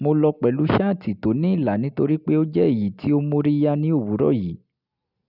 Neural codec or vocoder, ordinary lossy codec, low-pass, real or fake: none; none; 5.4 kHz; real